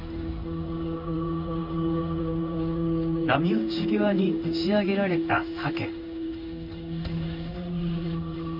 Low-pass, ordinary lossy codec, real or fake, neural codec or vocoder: 5.4 kHz; none; fake; codec, 16 kHz in and 24 kHz out, 1 kbps, XY-Tokenizer